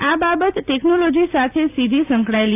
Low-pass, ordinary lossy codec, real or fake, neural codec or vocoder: 3.6 kHz; AAC, 24 kbps; real; none